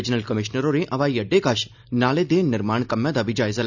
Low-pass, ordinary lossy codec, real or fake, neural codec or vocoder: 7.2 kHz; none; real; none